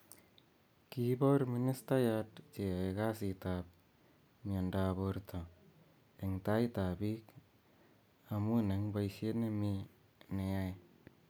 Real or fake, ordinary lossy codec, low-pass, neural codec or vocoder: real; none; none; none